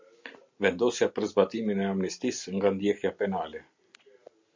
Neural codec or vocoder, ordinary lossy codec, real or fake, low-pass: none; MP3, 64 kbps; real; 7.2 kHz